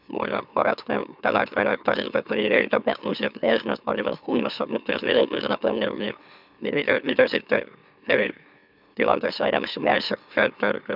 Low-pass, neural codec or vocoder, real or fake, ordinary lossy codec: 5.4 kHz; autoencoder, 44.1 kHz, a latent of 192 numbers a frame, MeloTTS; fake; none